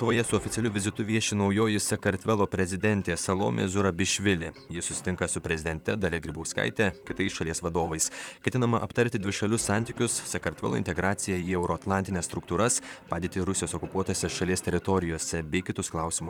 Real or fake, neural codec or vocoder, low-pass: fake; vocoder, 44.1 kHz, 128 mel bands, Pupu-Vocoder; 19.8 kHz